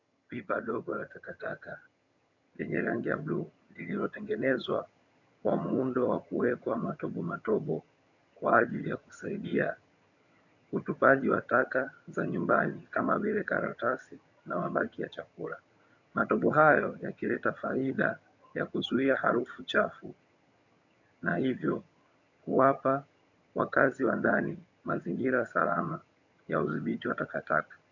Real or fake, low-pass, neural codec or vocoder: fake; 7.2 kHz; vocoder, 22.05 kHz, 80 mel bands, HiFi-GAN